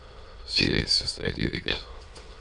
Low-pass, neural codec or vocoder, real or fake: 9.9 kHz; autoencoder, 22.05 kHz, a latent of 192 numbers a frame, VITS, trained on many speakers; fake